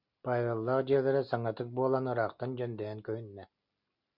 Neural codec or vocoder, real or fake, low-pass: none; real; 5.4 kHz